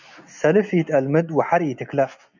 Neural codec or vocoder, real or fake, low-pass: none; real; 7.2 kHz